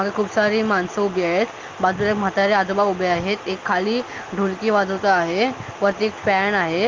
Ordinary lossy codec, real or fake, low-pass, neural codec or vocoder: Opus, 32 kbps; real; 7.2 kHz; none